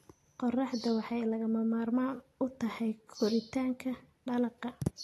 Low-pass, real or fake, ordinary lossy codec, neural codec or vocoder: 14.4 kHz; real; AAC, 48 kbps; none